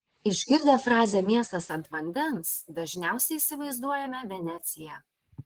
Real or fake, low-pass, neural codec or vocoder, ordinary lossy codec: fake; 19.8 kHz; vocoder, 44.1 kHz, 128 mel bands, Pupu-Vocoder; Opus, 16 kbps